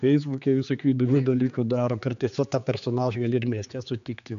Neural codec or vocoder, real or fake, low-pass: codec, 16 kHz, 4 kbps, X-Codec, HuBERT features, trained on general audio; fake; 7.2 kHz